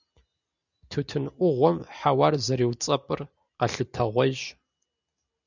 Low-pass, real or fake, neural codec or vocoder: 7.2 kHz; real; none